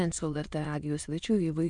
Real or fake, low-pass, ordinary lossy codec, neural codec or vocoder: fake; 9.9 kHz; MP3, 64 kbps; autoencoder, 22.05 kHz, a latent of 192 numbers a frame, VITS, trained on many speakers